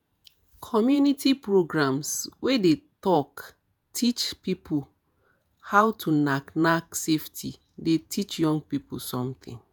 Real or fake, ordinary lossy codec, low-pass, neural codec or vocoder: fake; none; none; vocoder, 48 kHz, 128 mel bands, Vocos